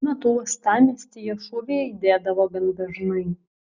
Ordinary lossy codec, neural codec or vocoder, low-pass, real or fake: Opus, 64 kbps; none; 7.2 kHz; real